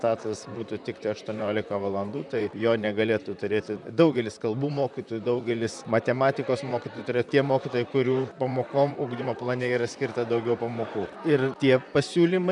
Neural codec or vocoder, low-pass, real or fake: vocoder, 44.1 kHz, 128 mel bands, Pupu-Vocoder; 10.8 kHz; fake